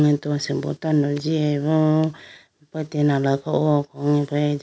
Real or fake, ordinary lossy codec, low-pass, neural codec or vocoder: real; none; none; none